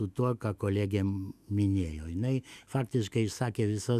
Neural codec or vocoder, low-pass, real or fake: autoencoder, 48 kHz, 128 numbers a frame, DAC-VAE, trained on Japanese speech; 14.4 kHz; fake